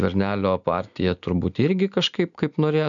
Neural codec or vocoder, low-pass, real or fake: none; 7.2 kHz; real